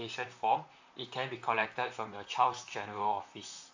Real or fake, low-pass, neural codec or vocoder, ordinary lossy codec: fake; 7.2 kHz; vocoder, 44.1 kHz, 128 mel bands, Pupu-Vocoder; AAC, 48 kbps